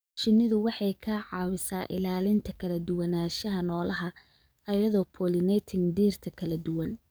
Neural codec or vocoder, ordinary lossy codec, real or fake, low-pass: codec, 44.1 kHz, 7.8 kbps, DAC; none; fake; none